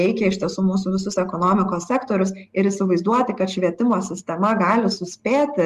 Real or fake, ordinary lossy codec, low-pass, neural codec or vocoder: real; Opus, 24 kbps; 14.4 kHz; none